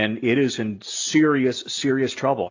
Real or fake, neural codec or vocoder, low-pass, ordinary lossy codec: real; none; 7.2 kHz; AAC, 48 kbps